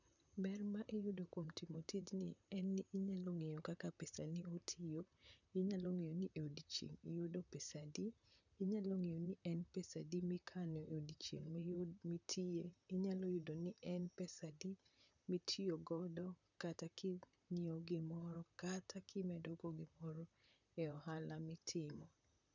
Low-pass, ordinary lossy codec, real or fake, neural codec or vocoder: 7.2 kHz; none; fake; vocoder, 44.1 kHz, 128 mel bands, Pupu-Vocoder